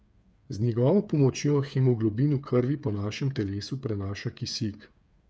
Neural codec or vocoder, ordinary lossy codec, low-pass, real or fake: codec, 16 kHz, 8 kbps, FreqCodec, smaller model; none; none; fake